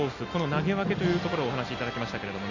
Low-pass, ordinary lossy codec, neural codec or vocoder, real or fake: 7.2 kHz; none; none; real